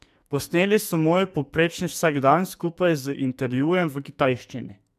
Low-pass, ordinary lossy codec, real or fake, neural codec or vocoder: 14.4 kHz; MP3, 96 kbps; fake; codec, 44.1 kHz, 2.6 kbps, SNAC